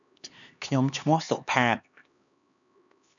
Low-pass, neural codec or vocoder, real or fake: 7.2 kHz; codec, 16 kHz, 2 kbps, X-Codec, HuBERT features, trained on LibriSpeech; fake